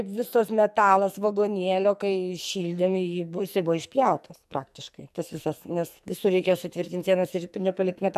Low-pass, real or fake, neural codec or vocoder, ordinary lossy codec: 14.4 kHz; fake; codec, 44.1 kHz, 2.6 kbps, SNAC; AAC, 96 kbps